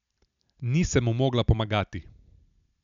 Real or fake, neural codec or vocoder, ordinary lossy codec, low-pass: real; none; none; 7.2 kHz